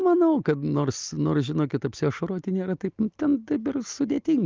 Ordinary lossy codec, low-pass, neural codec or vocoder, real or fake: Opus, 24 kbps; 7.2 kHz; none; real